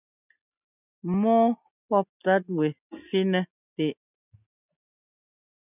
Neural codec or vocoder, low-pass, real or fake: none; 3.6 kHz; real